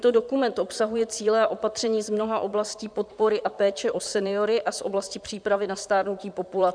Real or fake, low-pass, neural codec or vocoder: fake; 9.9 kHz; vocoder, 44.1 kHz, 128 mel bands, Pupu-Vocoder